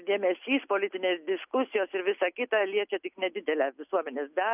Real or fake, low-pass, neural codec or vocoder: real; 3.6 kHz; none